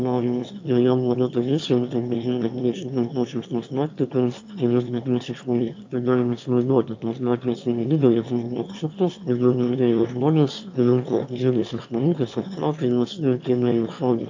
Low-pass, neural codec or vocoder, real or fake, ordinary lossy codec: 7.2 kHz; autoencoder, 22.05 kHz, a latent of 192 numbers a frame, VITS, trained on one speaker; fake; AAC, 48 kbps